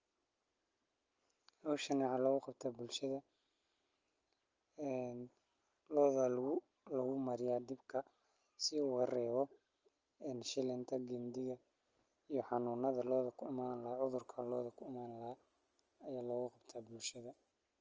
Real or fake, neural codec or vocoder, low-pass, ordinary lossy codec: real; none; 7.2 kHz; Opus, 24 kbps